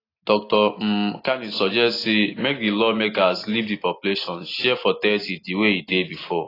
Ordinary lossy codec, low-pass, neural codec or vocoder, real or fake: AAC, 24 kbps; 5.4 kHz; none; real